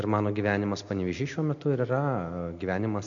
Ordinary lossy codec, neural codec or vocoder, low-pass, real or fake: MP3, 48 kbps; none; 7.2 kHz; real